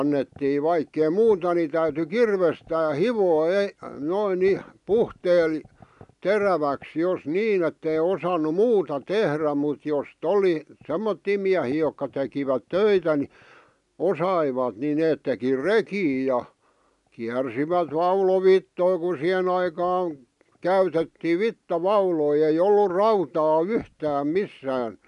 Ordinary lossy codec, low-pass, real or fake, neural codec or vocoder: none; 10.8 kHz; real; none